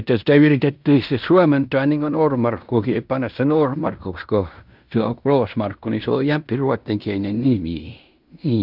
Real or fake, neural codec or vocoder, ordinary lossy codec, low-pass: fake; codec, 16 kHz in and 24 kHz out, 0.9 kbps, LongCat-Audio-Codec, fine tuned four codebook decoder; none; 5.4 kHz